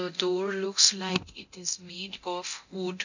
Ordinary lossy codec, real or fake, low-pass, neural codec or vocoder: none; fake; 7.2 kHz; codec, 24 kHz, 0.9 kbps, DualCodec